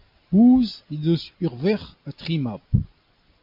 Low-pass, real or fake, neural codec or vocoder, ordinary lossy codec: 5.4 kHz; real; none; MP3, 48 kbps